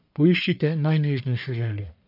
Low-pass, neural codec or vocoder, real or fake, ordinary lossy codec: 5.4 kHz; codec, 44.1 kHz, 1.7 kbps, Pupu-Codec; fake; none